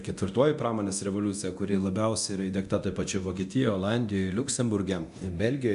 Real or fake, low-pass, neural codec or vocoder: fake; 10.8 kHz; codec, 24 kHz, 0.9 kbps, DualCodec